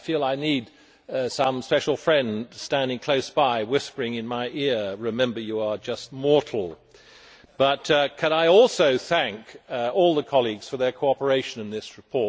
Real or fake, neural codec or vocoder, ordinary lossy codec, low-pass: real; none; none; none